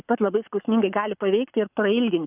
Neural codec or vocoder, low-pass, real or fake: codec, 44.1 kHz, 7.8 kbps, Pupu-Codec; 3.6 kHz; fake